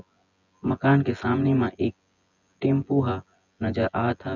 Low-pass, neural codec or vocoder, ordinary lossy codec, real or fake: 7.2 kHz; vocoder, 24 kHz, 100 mel bands, Vocos; none; fake